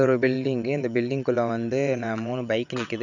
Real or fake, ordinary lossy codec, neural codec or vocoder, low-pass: fake; none; vocoder, 22.05 kHz, 80 mel bands, WaveNeXt; 7.2 kHz